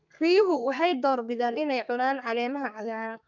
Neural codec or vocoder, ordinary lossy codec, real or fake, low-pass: codec, 32 kHz, 1.9 kbps, SNAC; none; fake; 7.2 kHz